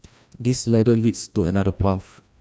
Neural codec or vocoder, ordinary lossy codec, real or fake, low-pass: codec, 16 kHz, 1 kbps, FreqCodec, larger model; none; fake; none